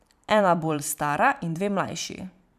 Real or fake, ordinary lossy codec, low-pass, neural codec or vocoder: fake; none; 14.4 kHz; vocoder, 44.1 kHz, 128 mel bands every 512 samples, BigVGAN v2